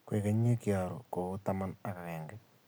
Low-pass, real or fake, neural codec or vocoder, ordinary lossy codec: none; real; none; none